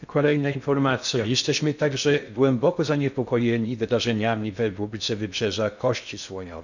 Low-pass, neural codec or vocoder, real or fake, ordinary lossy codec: 7.2 kHz; codec, 16 kHz in and 24 kHz out, 0.6 kbps, FocalCodec, streaming, 2048 codes; fake; none